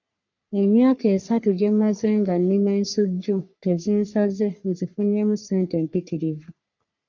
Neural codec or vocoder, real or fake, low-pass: codec, 44.1 kHz, 3.4 kbps, Pupu-Codec; fake; 7.2 kHz